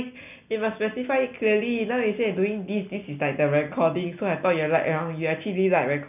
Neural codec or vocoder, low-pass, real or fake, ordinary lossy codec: none; 3.6 kHz; real; none